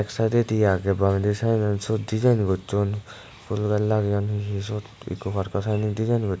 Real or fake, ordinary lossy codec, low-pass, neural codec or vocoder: real; none; none; none